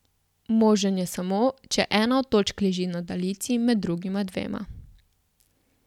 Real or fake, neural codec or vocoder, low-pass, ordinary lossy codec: real; none; 19.8 kHz; none